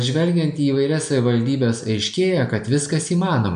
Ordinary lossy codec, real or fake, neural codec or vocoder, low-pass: MP3, 64 kbps; real; none; 9.9 kHz